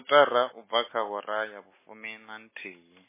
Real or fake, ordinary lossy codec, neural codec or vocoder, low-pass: real; MP3, 16 kbps; none; 3.6 kHz